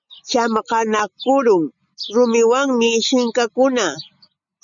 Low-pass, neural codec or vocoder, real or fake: 7.2 kHz; none; real